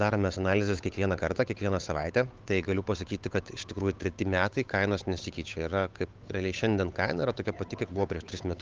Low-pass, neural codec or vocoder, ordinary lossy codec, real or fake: 7.2 kHz; codec, 16 kHz, 8 kbps, FunCodec, trained on Chinese and English, 25 frames a second; Opus, 24 kbps; fake